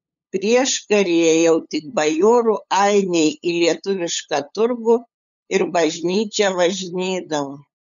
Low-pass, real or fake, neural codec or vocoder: 7.2 kHz; fake; codec, 16 kHz, 8 kbps, FunCodec, trained on LibriTTS, 25 frames a second